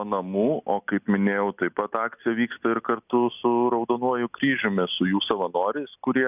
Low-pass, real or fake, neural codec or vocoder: 3.6 kHz; real; none